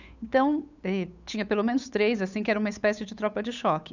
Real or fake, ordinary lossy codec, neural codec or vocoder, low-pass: fake; none; codec, 16 kHz, 8 kbps, FunCodec, trained on Chinese and English, 25 frames a second; 7.2 kHz